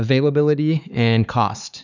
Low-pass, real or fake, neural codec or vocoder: 7.2 kHz; fake; codec, 16 kHz, 4 kbps, X-Codec, HuBERT features, trained on LibriSpeech